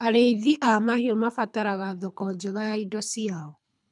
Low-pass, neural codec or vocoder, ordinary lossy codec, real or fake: 10.8 kHz; codec, 24 kHz, 3 kbps, HILCodec; none; fake